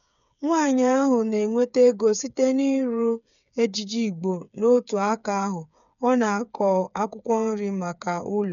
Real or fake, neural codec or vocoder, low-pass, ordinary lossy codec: fake; codec, 16 kHz, 8 kbps, FreqCodec, smaller model; 7.2 kHz; none